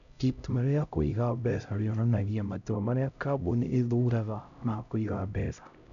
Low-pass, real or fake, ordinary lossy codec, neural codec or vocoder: 7.2 kHz; fake; none; codec, 16 kHz, 0.5 kbps, X-Codec, HuBERT features, trained on LibriSpeech